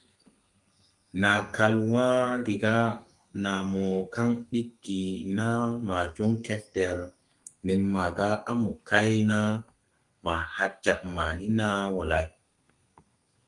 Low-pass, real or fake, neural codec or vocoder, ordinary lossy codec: 10.8 kHz; fake; codec, 32 kHz, 1.9 kbps, SNAC; Opus, 32 kbps